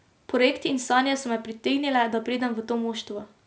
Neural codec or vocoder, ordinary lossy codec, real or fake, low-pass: none; none; real; none